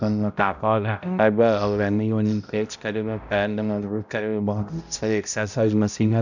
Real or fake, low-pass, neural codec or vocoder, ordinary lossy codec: fake; 7.2 kHz; codec, 16 kHz, 0.5 kbps, X-Codec, HuBERT features, trained on balanced general audio; none